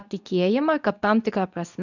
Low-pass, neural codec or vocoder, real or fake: 7.2 kHz; codec, 24 kHz, 0.9 kbps, WavTokenizer, medium speech release version 2; fake